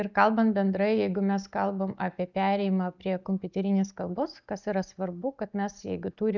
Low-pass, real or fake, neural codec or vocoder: 7.2 kHz; real; none